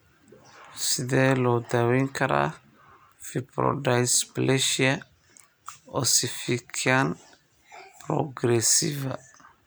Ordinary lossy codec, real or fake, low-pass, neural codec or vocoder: none; real; none; none